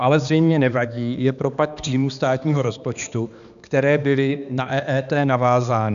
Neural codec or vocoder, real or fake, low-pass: codec, 16 kHz, 2 kbps, X-Codec, HuBERT features, trained on balanced general audio; fake; 7.2 kHz